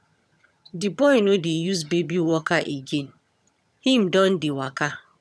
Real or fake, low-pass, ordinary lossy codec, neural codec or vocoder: fake; none; none; vocoder, 22.05 kHz, 80 mel bands, HiFi-GAN